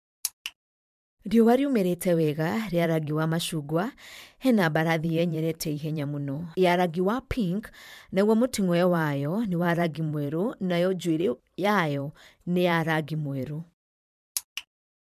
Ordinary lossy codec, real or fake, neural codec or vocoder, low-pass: MP3, 96 kbps; fake; vocoder, 44.1 kHz, 128 mel bands every 512 samples, BigVGAN v2; 14.4 kHz